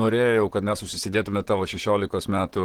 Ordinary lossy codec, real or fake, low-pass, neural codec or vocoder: Opus, 16 kbps; fake; 19.8 kHz; vocoder, 44.1 kHz, 128 mel bands, Pupu-Vocoder